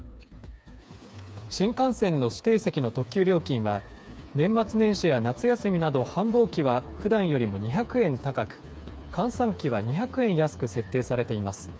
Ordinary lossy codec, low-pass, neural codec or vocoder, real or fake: none; none; codec, 16 kHz, 4 kbps, FreqCodec, smaller model; fake